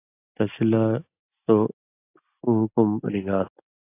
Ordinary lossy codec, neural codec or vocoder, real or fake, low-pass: AAC, 24 kbps; none; real; 3.6 kHz